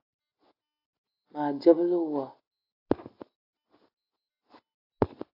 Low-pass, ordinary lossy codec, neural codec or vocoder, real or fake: 5.4 kHz; AAC, 32 kbps; none; real